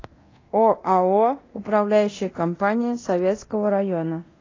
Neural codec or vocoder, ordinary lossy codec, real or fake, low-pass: codec, 24 kHz, 0.9 kbps, DualCodec; AAC, 32 kbps; fake; 7.2 kHz